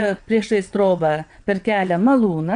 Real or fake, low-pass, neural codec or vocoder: fake; 9.9 kHz; vocoder, 22.05 kHz, 80 mel bands, WaveNeXt